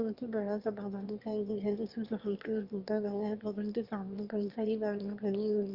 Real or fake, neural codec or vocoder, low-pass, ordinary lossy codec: fake; autoencoder, 22.05 kHz, a latent of 192 numbers a frame, VITS, trained on one speaker; 5.4 kHz; Opus, 32 kbps